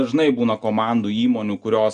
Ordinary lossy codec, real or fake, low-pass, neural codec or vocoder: Opus, 64 kbps; real; 9.9 kHz; none